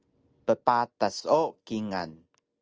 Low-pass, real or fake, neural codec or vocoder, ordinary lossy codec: 7.2 kHz; real; none; Opus, 24 kbps